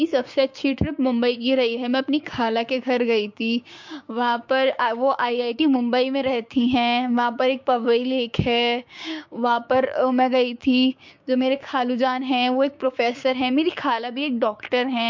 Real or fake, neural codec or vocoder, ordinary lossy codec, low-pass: fake; codec, 16 kHz, 6 kbps, DAC; MP3, 48 kbps; 7.2 kHz